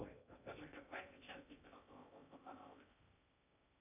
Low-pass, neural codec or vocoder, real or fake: 3.6 kHz; codec, 16 kHz in and 24 kHz out, 0.6 kbps, FocalCodec, streaming, 2048 codes; fake